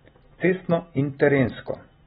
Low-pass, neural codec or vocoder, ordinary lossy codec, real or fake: 19.8 kHz; none; AAC, 16 kbps; real